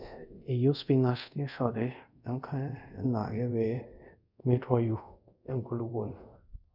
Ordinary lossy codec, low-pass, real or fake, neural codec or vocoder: none; 5.4 kHz; fake; codec, 24 kHz, 0.5 kbps, DualCodec